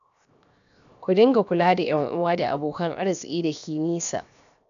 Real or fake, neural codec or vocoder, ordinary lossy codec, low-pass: fake; codec, 16 kHz, 0.7 kbps, FocalCodec; none; 7.2 kHz